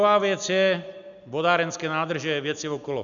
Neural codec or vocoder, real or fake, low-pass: none; real; 7.2 kHz